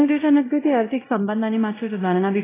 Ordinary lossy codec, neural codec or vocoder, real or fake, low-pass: AAC, 16 kbps; codec, 16 kHz, 0.5 kbps, X-Codec, WavLM features, trained on Multilingual LibriSpeech; fake; 3.6 kHz